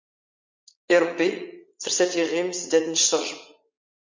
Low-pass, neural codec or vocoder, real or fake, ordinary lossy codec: 7.2 kHz; vocoder, 44.1 kHz, 80 mel bands, Vocos; fake; MP3, 48 kbps